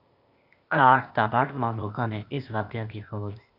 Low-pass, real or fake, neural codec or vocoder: 5.4 kHz; fake; codec, 16 kHz, 0.8 kbps, ZipCodec